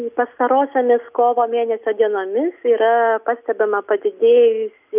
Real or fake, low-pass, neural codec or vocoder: real; 3.6 kHz; none